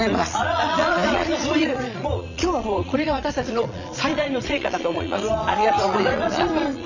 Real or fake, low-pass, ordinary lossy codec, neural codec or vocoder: fake; 7.2 kHz; none; vocoder, 22.05 kHz, 80 mel bands, Vocos